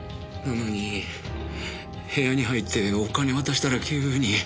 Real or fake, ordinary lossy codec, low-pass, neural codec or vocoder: real; none; none; none